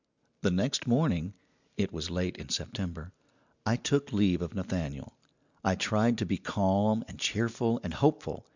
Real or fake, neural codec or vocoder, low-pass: real; none; 7.2 kHz